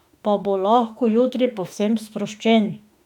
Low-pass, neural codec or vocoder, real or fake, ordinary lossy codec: 19.8 kHz; autoencoder, 48 kHz, 32 numbers a frame, DAC-VAE, trained on Japanese speech; fake; none